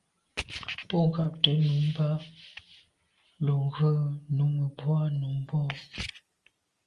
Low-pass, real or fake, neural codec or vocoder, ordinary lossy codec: 10.8 kHz; real; none; Opus, 32 kbps